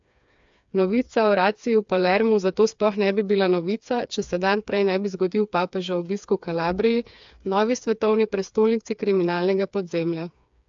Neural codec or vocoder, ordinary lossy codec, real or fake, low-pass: codec, 16 kHz, 4 kbps, FreqCodec, smaller model; AAC, 64 kbps; fake; 7.2 kHz